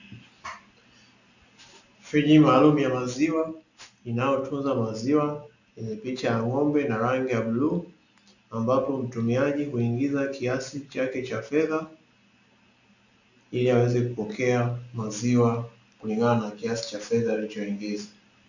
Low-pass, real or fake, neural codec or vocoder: 7.2 kHz; real; none